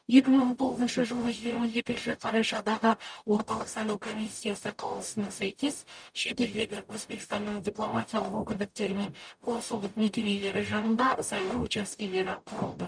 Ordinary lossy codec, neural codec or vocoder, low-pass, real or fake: none; codec, 44.1 kHz, 0.9 kbps, DAC; 9.9 kHz; fake